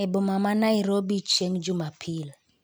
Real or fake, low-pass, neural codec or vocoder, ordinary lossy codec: real; none; none; none